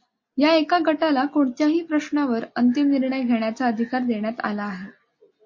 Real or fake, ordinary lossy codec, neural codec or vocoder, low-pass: real; MP3, 32 kbps; none; 7.2 kHz